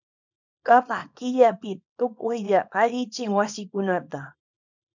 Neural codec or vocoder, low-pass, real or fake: codec, 24 kHz, 0.9 kbps, WavTokenizer, small release; 7.2 kHz; fake